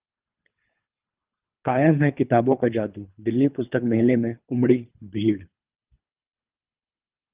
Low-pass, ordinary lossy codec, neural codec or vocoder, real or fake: 3.6 kHz; Opus, 32 kbps; codec, 24 kHz, 3 kbps, HILCodec; fake